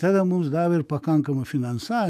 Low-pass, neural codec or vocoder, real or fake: 14.4 kHz; autoencoder, 48 kHz, 128 numbers a frame, DAC-VAE, trained on Japanese speech; fake